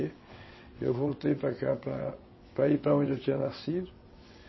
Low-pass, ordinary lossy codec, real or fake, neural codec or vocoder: 7.2 kHz; MP3, 24 kbps; fake; vocoder, 22.05 kHz, 80 mel bands, WaveNeXt